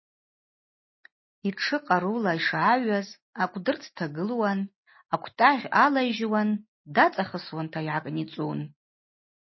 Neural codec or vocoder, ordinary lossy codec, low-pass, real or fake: none; MP3, 24 kbps; 7.2 kHz; real